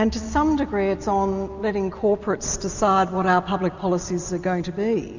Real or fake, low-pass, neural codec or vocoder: real; 7.2 kHz; none